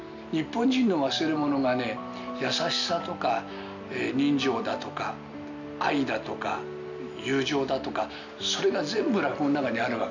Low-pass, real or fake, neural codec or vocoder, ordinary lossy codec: 7.2 kHz; real; none; none